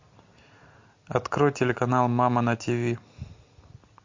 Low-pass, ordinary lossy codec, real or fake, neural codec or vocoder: 7.2 kHz; MP3, 48 kbps; real; none